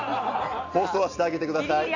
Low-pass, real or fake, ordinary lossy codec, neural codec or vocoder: 7.2 kHz; real; AAC, 48 kbps; none